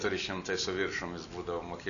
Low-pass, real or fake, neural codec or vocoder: 7.2 kHz; real; none